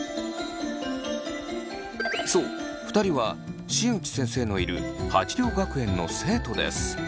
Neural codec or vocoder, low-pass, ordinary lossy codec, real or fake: none; none; none; real